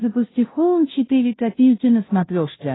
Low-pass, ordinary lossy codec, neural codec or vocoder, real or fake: 7.2 kHz; AAC, 16 kbps; codec, 16 kHz, 0.5 kbps, FunCodec, trained on Chinese and English, 25 frames a second; fake